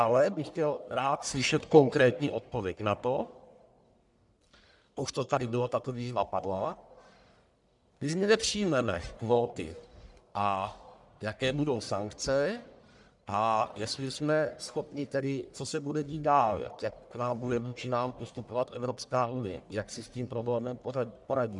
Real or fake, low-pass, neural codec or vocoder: fake; 10.8 kHz; codec, 44.1 kHz, 1.7 kbps, Pupu-Codec